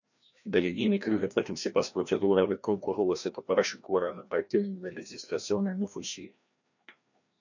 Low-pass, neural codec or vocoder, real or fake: 7.2 kHz; codec, 16 kHz, 1 kbps, FreqCodec, larger model; fake